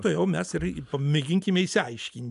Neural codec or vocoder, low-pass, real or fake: none; 10.8 kHz; real